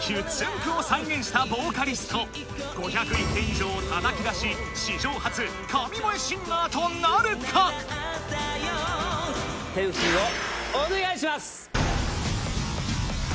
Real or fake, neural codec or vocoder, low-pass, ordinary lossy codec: real; none; none; none